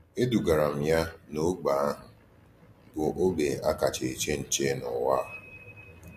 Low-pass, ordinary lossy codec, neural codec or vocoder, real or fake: 14.4 kHz; MP3, 64 kbps; none; real